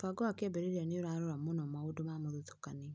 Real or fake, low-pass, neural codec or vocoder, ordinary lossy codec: real; none; none; none